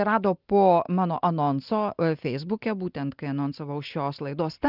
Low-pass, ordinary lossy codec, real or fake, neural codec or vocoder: 5.4 kHz; Opus, 32 kbps; real; none